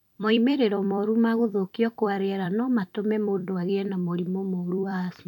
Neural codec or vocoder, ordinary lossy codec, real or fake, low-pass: vocoder, 44.1 kHz, 128 mel bands every 256 samples, BigVGAN v2; none; fake; 19.8 kHz